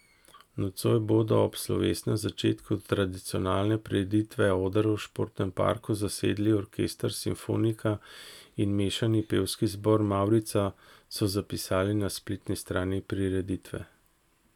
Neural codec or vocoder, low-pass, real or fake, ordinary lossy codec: none; 19.8 kHz; real; none